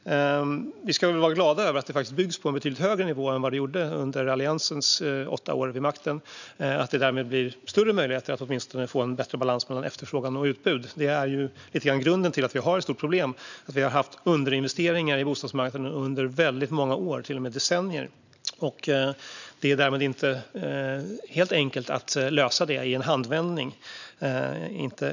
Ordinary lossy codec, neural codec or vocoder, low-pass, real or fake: none; none; 7.2 kHz; real